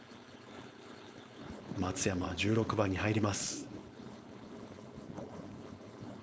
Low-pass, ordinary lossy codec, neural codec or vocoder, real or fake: none; none; codec, 16 kHz, 4.8 kbps, FACodec; fake